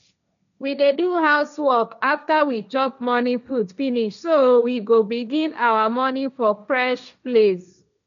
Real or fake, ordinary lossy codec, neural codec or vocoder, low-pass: fake; none; codec, 16 kHz, 1.1 kbps, Voila-Tokenizer; 7.2 kHz